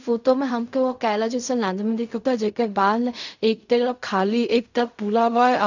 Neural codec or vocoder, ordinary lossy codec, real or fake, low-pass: codec, 16 kHz in and 24 kHz out, 0.4 kbps, LongCat-Audio-Codec, fine tuned four codebook decoder; none; fake; 7.2 kHz